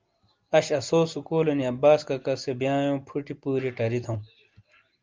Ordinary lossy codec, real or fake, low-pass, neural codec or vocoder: Opus, 32 kbps; real; 7.2 kHz; none